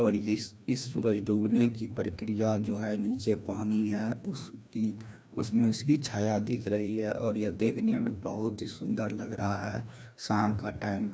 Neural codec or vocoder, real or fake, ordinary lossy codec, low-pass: codec, 16 kHz, 1 kbps, FreqCodec, larger model; fake; none; none